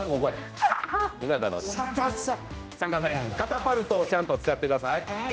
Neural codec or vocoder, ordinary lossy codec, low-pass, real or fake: codec, 16 kHz, 1 kbps, X-Codec, HuBERT features, trained on general audio; none; none; fake